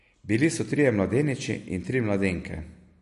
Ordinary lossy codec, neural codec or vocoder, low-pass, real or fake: MP3, 48 kbps; none; 14.4 kHz; real